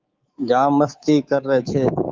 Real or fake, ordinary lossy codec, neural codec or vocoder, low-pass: fake; Opus, 32 kbps; codec, 24 kHz, 3.1 kbps, DualCodec; 7.2 kHz